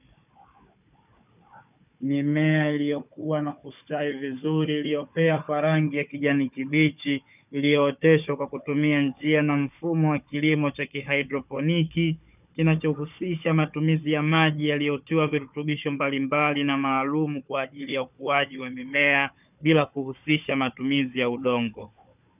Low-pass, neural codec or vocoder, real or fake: 3.6 kHz; codec, 16 kHz, 4 kbps, FunCodec, trained on Chinese and English, 50 frames a second; fake